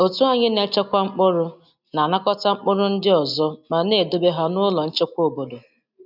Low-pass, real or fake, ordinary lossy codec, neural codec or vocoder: 5.4 kHz; real; none; none